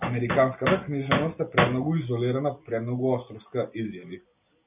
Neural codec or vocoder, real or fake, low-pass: none; real; 3.6 kHz